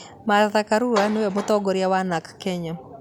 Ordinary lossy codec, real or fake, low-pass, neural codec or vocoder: none; real; 19.8 kHz; none